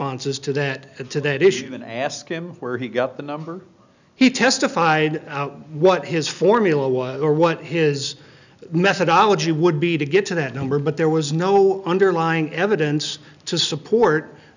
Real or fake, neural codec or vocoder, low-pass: real; none; 7.2 kHz